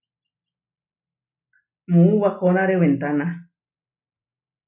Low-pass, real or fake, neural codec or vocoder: 3.6 kHz; real; none